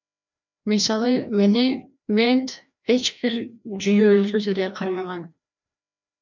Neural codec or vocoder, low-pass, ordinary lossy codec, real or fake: codec, 16 kHz, 1 kbps, FreqCodec, larger model; 7.2 kHz; MP3, 64 kbps; fake